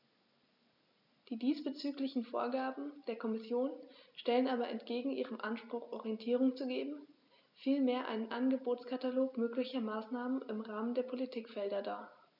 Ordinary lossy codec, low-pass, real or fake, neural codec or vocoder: none; 5.4 kHz; real; none